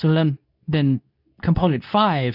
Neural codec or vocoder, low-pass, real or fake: codec, 24 kHz, 0.9 kbps, WavTokenizer, medium speech release version 2; 5.4 kHz; fake